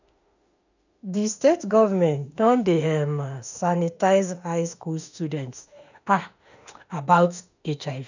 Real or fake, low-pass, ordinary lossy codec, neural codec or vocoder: fake; 7.2 kHz; none; autoencoder, 48 kHz, 32 numbers a frame, DAC-VAE, trained on Japanese speech